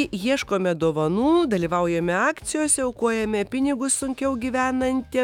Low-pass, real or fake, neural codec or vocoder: 19.8 kHz; fake; autoencoder, 48 kHz, 128 numbers a frame, DAC-VAE, trained on Japanese speech